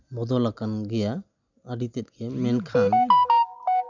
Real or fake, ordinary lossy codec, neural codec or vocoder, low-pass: real; none; none; 7.2 kHz